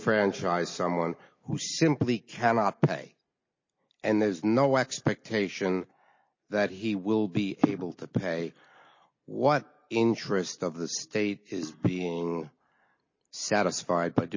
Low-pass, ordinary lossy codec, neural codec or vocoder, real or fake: 7.2 kHz; MP3, 32 kbps; none; real